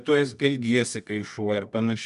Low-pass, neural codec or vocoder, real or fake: 10.8 kHz; codec, 24 kHz, 0.9 kbps, WavTokenizer, medium music audio release; fake